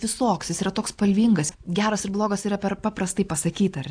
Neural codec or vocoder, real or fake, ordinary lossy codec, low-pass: none; real; AAC, 64 kbps; 9.9 kHz